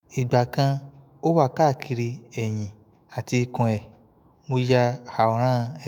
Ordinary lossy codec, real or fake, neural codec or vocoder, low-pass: none; fake; autoencoder, 48 kHz, 128 numbers a frame, DAC-VAE, trained on Japanese speech; none